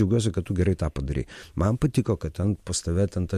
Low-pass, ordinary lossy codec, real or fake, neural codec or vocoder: 14.4 kHz; MP3, 64 kbps; real; none